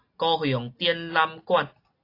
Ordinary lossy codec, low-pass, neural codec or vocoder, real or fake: AAC, 32 kbps; 5.4 kHz; none; real